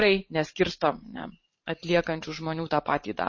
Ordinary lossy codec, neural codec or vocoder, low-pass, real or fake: MP3, 32 kbps; vocoder, 44.1 kHz, 128 mel bands every 512 samples, BigVGAN v2; 7.2 kHz; fake